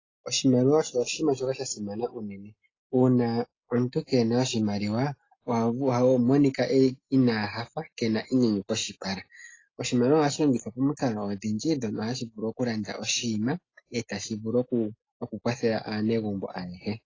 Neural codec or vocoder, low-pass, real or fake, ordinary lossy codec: none; 7.2 kHz; real; AAC, 32 kbps